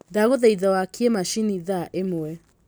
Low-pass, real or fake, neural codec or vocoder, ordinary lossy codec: none; real; none; none